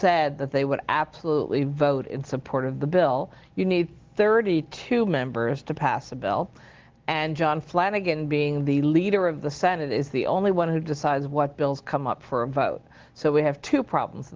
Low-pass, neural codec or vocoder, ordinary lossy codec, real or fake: 7.2 kHz; none; Opus, 16 kbps; real